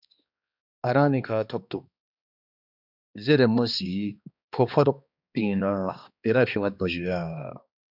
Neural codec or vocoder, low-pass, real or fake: codec, 16 kHz, 2 kbps, X-Codec, HuBERT features, trained on balanced general audio; 5.4 kHz; fake